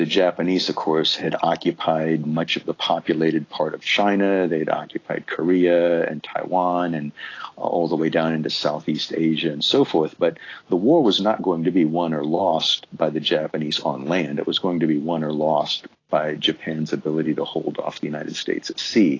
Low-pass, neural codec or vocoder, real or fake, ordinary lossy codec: 7.2 kHz; none; real; AAC, 32 kbps